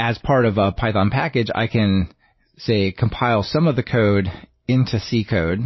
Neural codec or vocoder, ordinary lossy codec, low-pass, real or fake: none; MP3, 24 kbps; 7.2 kHz; real